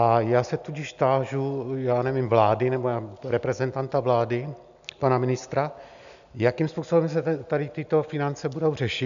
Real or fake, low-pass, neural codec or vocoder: real; 7.2 kHz; none